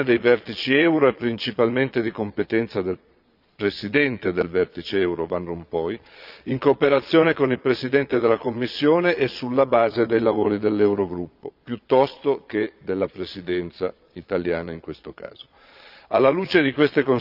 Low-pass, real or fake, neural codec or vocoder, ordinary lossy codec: 5.4 kHz; fake; vocoder, 22.05 kHz, 80 mel bands, Vocos; none